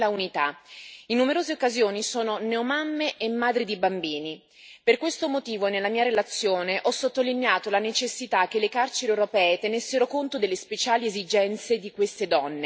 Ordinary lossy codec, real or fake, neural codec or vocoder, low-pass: none; real; none; none